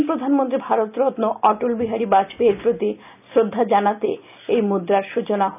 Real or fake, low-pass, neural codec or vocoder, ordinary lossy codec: real; 3.6 kHz; none; none